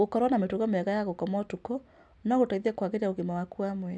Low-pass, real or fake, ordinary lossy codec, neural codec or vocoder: none; real; none; none